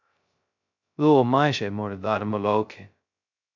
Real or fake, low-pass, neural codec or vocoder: fake; 7.2 kHz; codec, 16 kHz, 0.2 kbps, FocalCodec